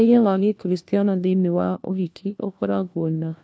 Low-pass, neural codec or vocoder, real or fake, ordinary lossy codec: none; codec, 16 kHz, 1 kbps, FunCodec, trained on LibriTTS, 50 frames a second; fake; none